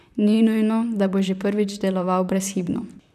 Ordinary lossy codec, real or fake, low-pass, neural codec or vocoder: none; fake; 14.4 kHz; vocoder, 44.1 kHz, 128 mel bands, Pupu-Vocoder